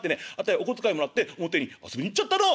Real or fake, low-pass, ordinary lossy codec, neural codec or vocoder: real; none; none; none